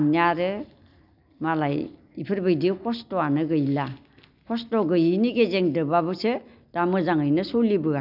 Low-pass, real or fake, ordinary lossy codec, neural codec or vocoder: 5.4 kHz; real; none; none